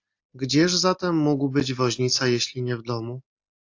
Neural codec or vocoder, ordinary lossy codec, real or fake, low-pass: none; AAC, 48 kbps; real; 7.2 kHz